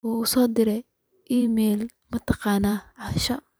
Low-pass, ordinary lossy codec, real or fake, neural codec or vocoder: none; none; fake; vocoder, 44.1 kHz, 128 mel bands every 512 samples, BigVGAN v2